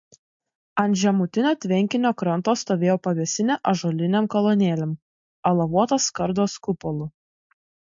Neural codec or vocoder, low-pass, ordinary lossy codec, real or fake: none; 7.2 kHz; MP3, 48 kbps; real